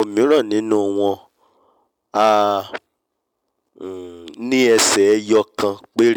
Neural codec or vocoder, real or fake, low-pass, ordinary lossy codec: none; real; 19.8 kHz; none